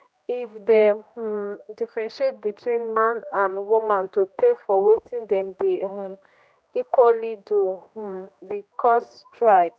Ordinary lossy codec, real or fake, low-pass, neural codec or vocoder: none; fake; none; codec, 16 kHz, 1 kbps, X-Codec, HuBERT features, trained on general audio